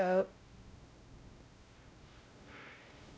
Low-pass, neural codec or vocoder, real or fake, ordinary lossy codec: none; codec, 16 kHz, 0.5 kbps, X-Codec, WavLM features, trained on Multilingual LibriSpeech; fake; none